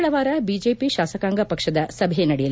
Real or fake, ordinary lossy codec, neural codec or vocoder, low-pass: real; none; none; none